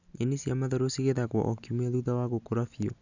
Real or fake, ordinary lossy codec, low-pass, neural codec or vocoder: real; none; 7.2 kHz; none